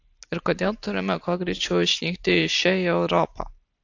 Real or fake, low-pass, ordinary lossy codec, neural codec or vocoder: real; 7.2 kHz; AAC, 48 kbps; none